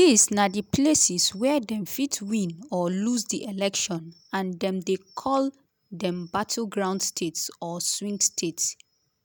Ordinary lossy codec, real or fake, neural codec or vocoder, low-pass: none; real; none; none